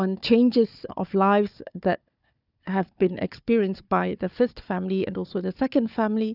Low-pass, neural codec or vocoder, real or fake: 5.4 kHz; codec, 16 kHz, 4 kbps, FunCodec, trained on Chinese and English, 50 frames a second; fake